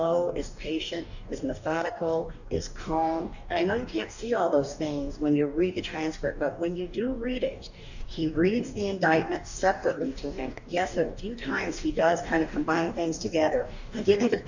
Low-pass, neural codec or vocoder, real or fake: 7.2 kHz; codec, 44.1 kHz, 2.6 kbps, DAC; fake